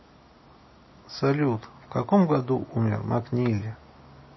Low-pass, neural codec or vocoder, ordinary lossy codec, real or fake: 7.2 kHz; none; MP3, 24 kbps; real